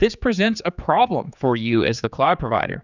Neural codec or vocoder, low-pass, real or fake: codec, 16 kHz, 4 kbps, X-Codec, HuBERT features, trained on general audio; 7.2 kHz; fake